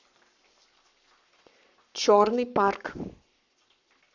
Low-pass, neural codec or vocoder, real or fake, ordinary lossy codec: 7.2 kHz; codec, 44.1 kHz, 7.8 kbps, Pupu-Codec; fake; none